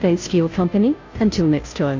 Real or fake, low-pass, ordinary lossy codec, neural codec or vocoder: fake; 7.2 kHz; AAC, 48 kbps; codec, 16 kHz, 0.5 kbps, FunCodec, trained on Chinese and English, 25 frames a second